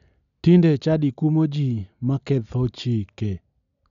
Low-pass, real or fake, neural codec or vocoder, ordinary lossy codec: 7.2 kHz; real; none; none